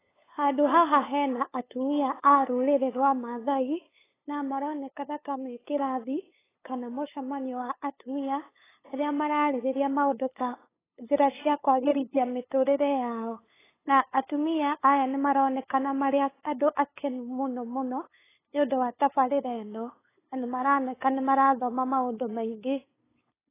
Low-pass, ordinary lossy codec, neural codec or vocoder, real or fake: 3.6 kHz; AAC, 16 kbps; codec, 16 kHz, 8 kbps, FunCodec, trained on LibriTTS, 25 frames a second; fake